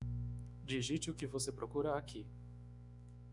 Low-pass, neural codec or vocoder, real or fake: 10.8 kHz; autoencoder, 48 kHz, 128 numbers a frame, DAC-VAE, trained on Japanese speech; fake